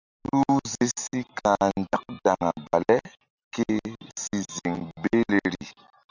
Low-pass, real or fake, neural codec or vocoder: 7.2 kHz; real; none